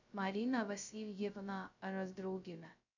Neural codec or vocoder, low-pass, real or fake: codec, 16 kHz, 0.2 kbps, FocalCodec; 7.2 kHz; fake